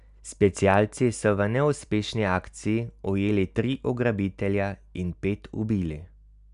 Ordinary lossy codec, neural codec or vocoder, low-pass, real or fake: none; none; 10.8 kHz; real